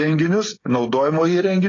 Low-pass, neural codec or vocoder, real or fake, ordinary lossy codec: 7.2 kHz; codec, 16 kHz, 8 kbps, FreqCodec, smaller model; fake; AAC, 32 kbps